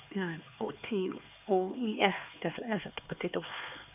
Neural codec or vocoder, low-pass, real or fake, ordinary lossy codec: codec, 16 kHz, 4 kbps, X-Codec, HuBERT features, trained on LibriSpeech; 3.6 kHz; fake; none